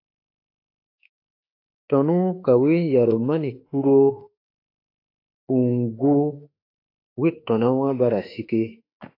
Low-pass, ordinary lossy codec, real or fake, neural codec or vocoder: 5.4 kHz; AAC, 32 kbps; fake; autoencoder, 48 kHz, 32 numbers a frame, DAC-VAE, trained on Japanese speech